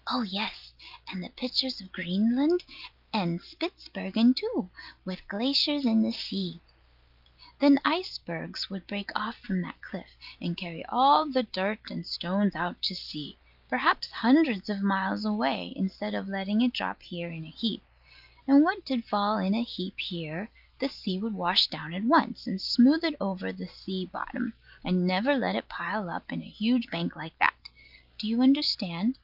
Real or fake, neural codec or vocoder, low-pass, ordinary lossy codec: real; none; 5.4 kHz; Opus, 24 kbps